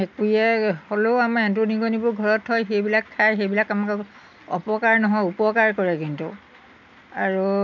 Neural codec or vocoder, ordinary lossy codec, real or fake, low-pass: none; none; real; 7.2 kHz